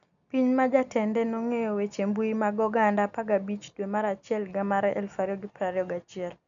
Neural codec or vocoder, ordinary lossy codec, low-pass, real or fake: none; none; 7.2 kHz; real